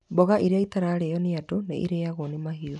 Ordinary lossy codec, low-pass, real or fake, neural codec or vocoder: none; 10.8 kHz; real; none